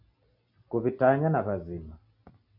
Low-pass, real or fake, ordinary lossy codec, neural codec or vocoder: 5.4 kHz; real; AAC, 48 kbps; none